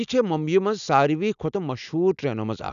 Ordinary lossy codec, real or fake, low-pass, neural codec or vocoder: none; real; 7.2 kHz; none